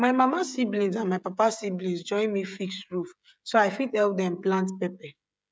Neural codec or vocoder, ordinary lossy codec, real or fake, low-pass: codec, 16 kHz, 16 kbps, FreqCodec, smaller model; none; fake; none